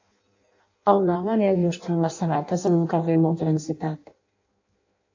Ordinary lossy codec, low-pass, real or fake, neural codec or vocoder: AAC, 48 kbps; 7.2 kHz; fake; codec, 16 kHz in and 24 kHz out, 0.6 kbps, FireRedTTS-2 codec